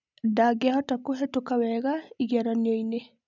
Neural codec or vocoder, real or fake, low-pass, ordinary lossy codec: none; real; 7.2 kHz; none